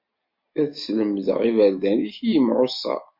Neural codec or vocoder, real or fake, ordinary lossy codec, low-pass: none; real; MP3, 32 kbps; 5.4 kHz